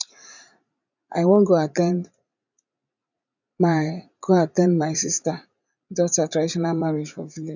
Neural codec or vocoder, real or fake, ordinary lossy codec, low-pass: vocoder, 44.1 kHz, 80 mel bands, Vocos; fake; none; 7.2 kHz